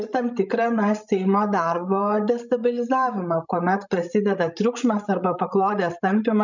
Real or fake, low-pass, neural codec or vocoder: fake; 7.2 kHz; codec, 16 kHz, 16 kbps, FreqCodec, larger model